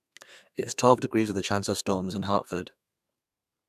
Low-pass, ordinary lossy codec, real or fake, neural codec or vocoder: 14.4 kHz; none; fake; codec, 32 kHz, 1.9 kbps, SNAC